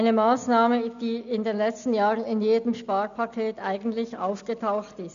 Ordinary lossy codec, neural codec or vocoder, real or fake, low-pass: MP3, 96 kbps; none; real; 7.2 kHz